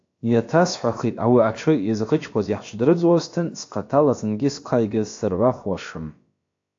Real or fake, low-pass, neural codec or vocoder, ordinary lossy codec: fake; 7.2 kHz; codec, 16 kHz, about 1 kbps, DyCAST, with the encoder's durations; AAC, 48 kbps